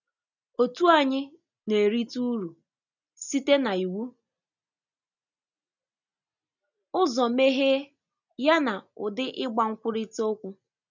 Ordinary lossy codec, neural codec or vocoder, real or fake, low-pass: none; none; real; 7.2 kHz